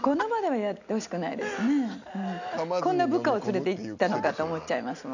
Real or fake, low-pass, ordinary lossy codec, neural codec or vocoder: real; 7.2 kHz; none; none